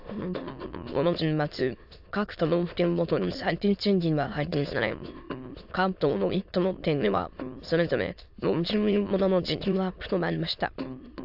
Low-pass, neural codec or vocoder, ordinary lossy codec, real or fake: 5.4 kHz; autoencoder, 22.05 kHz, a latent of 192 numbers a frame, VITS, trained on many speakers; AAC, 48 kbps; fake